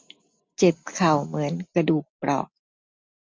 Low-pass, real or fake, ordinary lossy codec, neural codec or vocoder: none; real; none; none